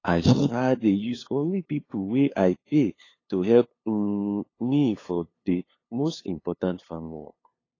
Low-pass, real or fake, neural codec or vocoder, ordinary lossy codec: 7.2 kHz; fake; codec, 16 kHz, 2 kbps, FunCodec, trained on LibriTTS, 25 frames a second; AAC, 32 kbps